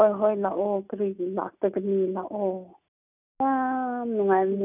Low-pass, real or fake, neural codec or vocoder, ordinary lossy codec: 3.6 kHz; real; none; none